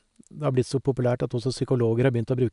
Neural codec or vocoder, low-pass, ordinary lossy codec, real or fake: none; 10.8 kHz; none; real